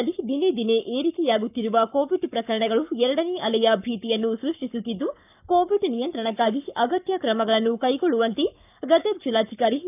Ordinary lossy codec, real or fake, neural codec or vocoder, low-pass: none; fake; codec, 44.1 kHz, 7.8 kbps, Pupu-Codec; 3.6 kHz